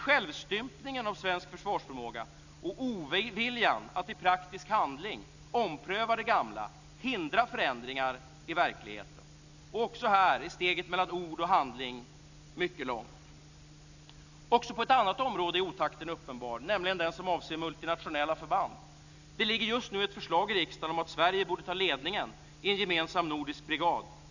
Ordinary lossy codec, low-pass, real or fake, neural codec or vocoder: none; 7.2 kHz; real; none